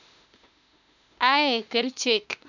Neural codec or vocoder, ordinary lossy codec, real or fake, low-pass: autoencoder, 48 kHz, 32 numbers a frame, DAC-VAE, trained on Japanese speech; none; fake; 7.2 kHz